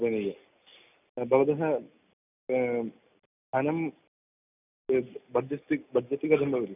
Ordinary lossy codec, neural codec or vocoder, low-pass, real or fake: none; none; 3.6 kHz; real